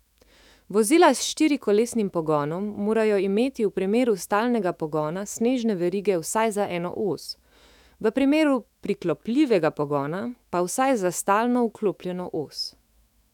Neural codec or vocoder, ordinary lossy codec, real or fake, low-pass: autoencoder, 48 kHz, 128 numbers a frame, DAC-VAE, trained on Japanese speech; none; fake; 19.8 kHz